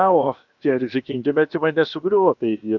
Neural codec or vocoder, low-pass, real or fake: codec, 16 kHz, about 1 kbps, DyCAST, with the encoder's durations; 7.2 kHz; fake